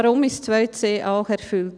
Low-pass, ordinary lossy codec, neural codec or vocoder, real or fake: 9.9 kHz; none; none; real